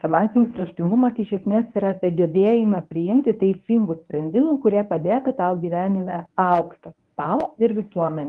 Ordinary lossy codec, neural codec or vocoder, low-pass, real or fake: Opus, 32 kbps; codec, 24 kHz, 0.9 kbps, WavTokenizer, medium speech release version 1; 10.8 kHz; fake